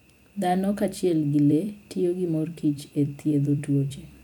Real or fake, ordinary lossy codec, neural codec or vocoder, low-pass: fake; none; vocoder, 44.1 kHz, 128 mel bands every 256 samples, BigVGAN v2; 19.8 kHz